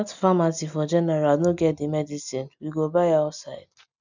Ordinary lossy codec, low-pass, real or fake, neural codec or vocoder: none; 7.2 kHz; real; none